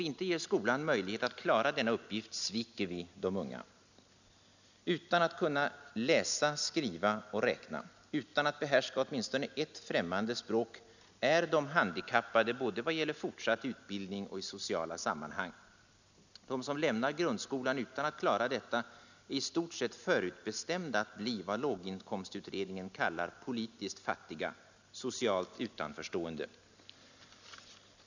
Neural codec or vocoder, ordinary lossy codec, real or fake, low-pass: none; none; real; 7.2 kHz